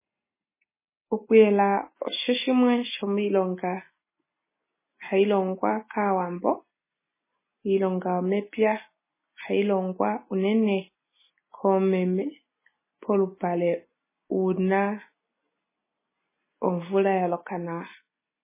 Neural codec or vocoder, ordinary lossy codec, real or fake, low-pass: none; MP3, 16 kbps; real; 3.6 kHz